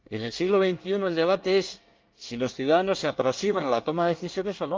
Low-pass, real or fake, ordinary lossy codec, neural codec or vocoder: 7.2 kHz; fake; Opus, 24 kbps; codec, 24 kHz, 1 kbps, SNAC